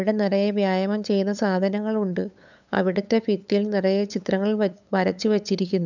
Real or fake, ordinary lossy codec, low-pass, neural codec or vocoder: fake; none; 7.2 kHz; codec, 16 kHz, 8 kbps, FunCodec, trained on LibriTTS, 25 frames a second